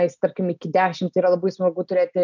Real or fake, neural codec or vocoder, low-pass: real; none; 7.2 kHz